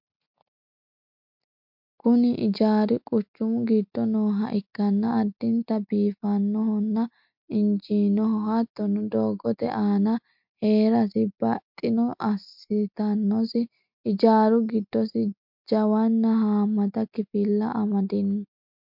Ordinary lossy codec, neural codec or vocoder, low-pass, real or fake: MP3, 48 kbps; none; 5.4 kHz; real